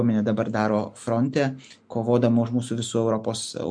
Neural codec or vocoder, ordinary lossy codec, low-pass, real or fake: none; MP3, 64 kbps; 9.9 kHz; real